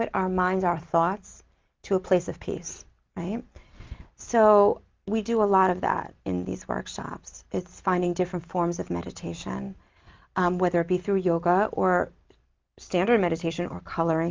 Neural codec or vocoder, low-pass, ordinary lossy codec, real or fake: none; 7.2 kHz; Opus, 32 kbps; real